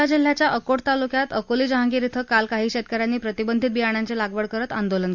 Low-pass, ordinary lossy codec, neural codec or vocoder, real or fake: 7.2 kHz; none; none; real